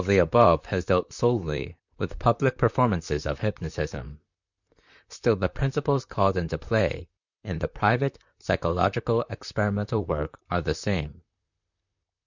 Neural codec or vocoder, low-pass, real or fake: vocoder, 44.1 kHz, 128 mel bands, Pupu-Vocoder; 7.2 kHz; fake